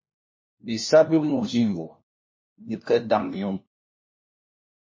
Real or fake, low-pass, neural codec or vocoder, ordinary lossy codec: fake; 7.2 kHz; codec, 16 kHz, 1 kbps, FunCodec, trained on LibriTTS, 50 frames a second; MP3, 32 kbps